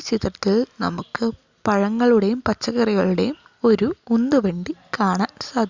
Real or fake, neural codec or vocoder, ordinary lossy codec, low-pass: real; none; Opus, 64 kbps; 7.2 kHz